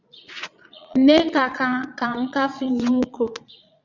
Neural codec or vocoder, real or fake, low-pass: vocoder, 22.05 kHz, 80 mel bands, Vocos; fake; 7.2 kHz